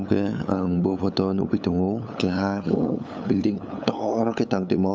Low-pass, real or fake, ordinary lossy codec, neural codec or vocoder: none; fake; none; codec, 16 kHz, 8 kbps, FunCodec, trained on LibriTTS, 25 frames a second